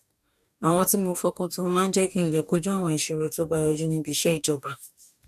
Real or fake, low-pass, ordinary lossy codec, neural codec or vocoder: fake; 14.4 kHz; none; codec, 44.1 kHz, 2.6 kbps, DAC